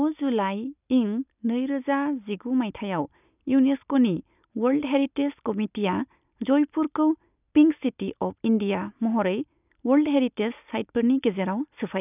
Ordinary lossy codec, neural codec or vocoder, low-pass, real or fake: none; none; 3.6 kHz; real